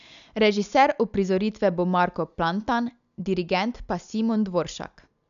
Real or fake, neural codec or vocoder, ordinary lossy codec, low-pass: real; none; none; 7.2 kHz